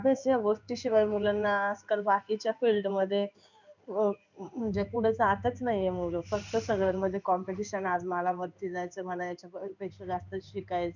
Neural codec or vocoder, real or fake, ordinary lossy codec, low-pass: codec, 16 kHz in and 24 kHz out, 1 kbps, XY-Tokenizer; fake; none; 7.2 kHz